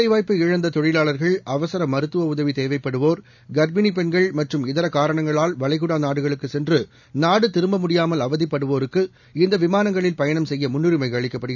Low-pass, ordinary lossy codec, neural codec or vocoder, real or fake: 7.2 kHz; none; none; real